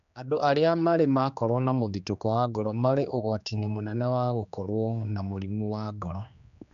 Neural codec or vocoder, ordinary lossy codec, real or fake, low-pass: codec, 16 kHz, 2 kbps, X-Codec, HuBERT features, trained on general audio; none; fake; 7.2 kHz